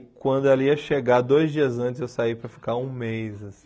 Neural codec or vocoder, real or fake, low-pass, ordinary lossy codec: none; real; none; none